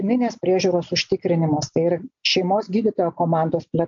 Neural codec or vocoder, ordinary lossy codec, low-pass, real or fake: none; AAC, 64 kbps; 7.2 kHz; real